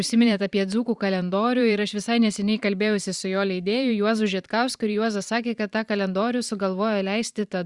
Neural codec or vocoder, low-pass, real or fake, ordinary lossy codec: vocoder, 44.1 kHz, 128 mel bands every 512 samples, BigVGAN v2; 10.8 kHz; fake; Opus, 64 kbps